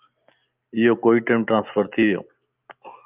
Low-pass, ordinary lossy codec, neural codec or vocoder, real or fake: 3.6 kHz; Opus, 24 kbps; none; real